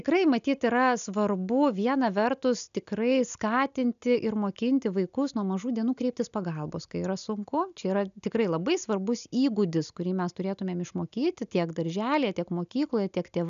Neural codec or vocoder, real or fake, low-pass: none; real; 7.2 kHz